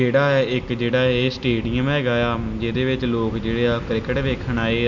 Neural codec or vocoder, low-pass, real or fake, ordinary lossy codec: none; 7.2 kHz; real; none